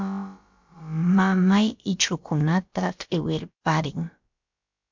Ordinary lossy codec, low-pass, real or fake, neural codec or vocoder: MP3, 64 kbps; 7.2 kHz; fake; codec, 16 kHz, about 1 kbps, DyCAST, with the encoder's durations